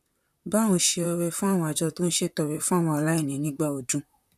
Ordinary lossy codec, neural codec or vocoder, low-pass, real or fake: none; vocoder, 44.1 kHz, 128 mel bands, Pupu-Vocoder; 14.4 kHz; fake